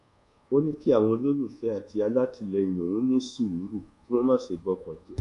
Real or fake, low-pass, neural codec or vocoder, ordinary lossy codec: fake; 10.8 kHz; codec, 24 kHz, 1.2 kbps, DualCodec; none